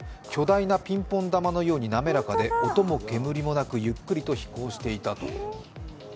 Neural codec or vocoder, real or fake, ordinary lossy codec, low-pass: none; real; none; none